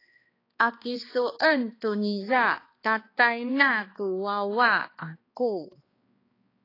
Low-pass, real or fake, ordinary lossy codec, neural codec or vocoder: 5.4 kHz; fake; AAC, 24 kbps; codec, 16 kHz, 2 kbps, X-Codec, HuBERT features, trained on balanced general audio